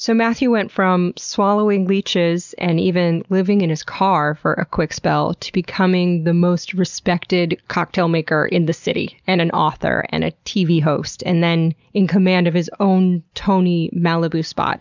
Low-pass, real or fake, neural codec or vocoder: 7.2 kHz; real; none